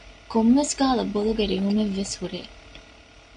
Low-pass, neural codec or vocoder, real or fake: 9.9 kHz; none; real